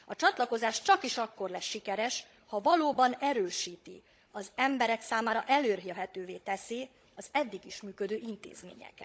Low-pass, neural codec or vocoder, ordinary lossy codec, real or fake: none; codec, 16 kHz, 16 kbps, FunCodec, trained on Chinese and English, 50 frames a second; none; fake